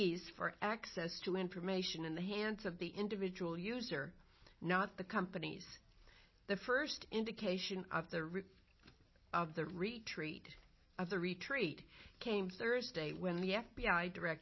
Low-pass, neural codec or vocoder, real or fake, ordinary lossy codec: 7.2 kHz; none; real; MP3, 24 kbps